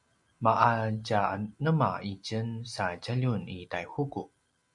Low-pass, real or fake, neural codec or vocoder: 10.8 kHz; real; none